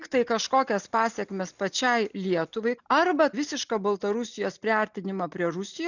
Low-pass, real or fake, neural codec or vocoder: 7.2 kHz; real; none